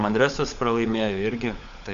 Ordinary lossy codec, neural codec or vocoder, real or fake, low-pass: MP3, 64 kbps; codec, 16 kHz, 16 kbps, FunCodec, trained on LibriTTS, 50 frames a second; fake; 7.2 kHz